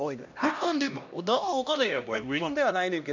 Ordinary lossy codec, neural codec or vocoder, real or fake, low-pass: none; codec, 16 kHz, 1 kbps, X-Codec, HuBERT features, trained on LibriSpeech; fake; 7.2 kHz